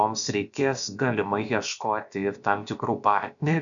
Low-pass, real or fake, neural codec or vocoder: 7.2 kHz; fake; codec, 16 kHz, about 1 kbps, DyCAST, with the encoder's durations